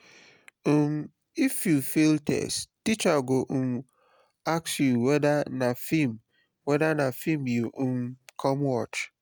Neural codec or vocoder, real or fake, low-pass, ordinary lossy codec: none; real; none; none